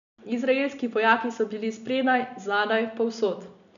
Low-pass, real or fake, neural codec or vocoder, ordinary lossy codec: 7.2 kHz; real; none; none